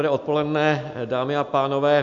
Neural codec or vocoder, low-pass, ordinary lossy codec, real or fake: none; 7.2 kHz; MP3, 96 kbps; real